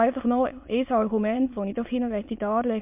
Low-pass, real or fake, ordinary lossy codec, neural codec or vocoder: 3.6 kHz; fake; MP3, 32 kbps; autoencoder, 22.05 kHz, a latent of 192 numbers a frame, VITS, trained on many speakers